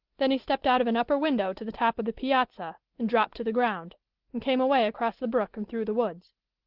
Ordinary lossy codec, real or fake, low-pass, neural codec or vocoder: Opus, 16 kbps; real; 5.4 kHz; none